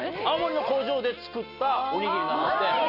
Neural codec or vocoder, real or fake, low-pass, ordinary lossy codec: none; real; 5.4 kHz; none